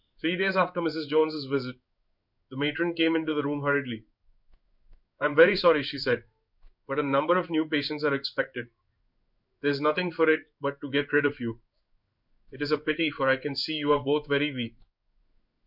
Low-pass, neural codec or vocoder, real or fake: 5.4 kHz; codec, 16 kHz in and 24 kHz out, 1 kbps, XY-Tokenizer; fake